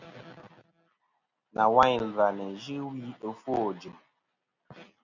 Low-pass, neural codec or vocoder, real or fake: 7.2 kHz; none; real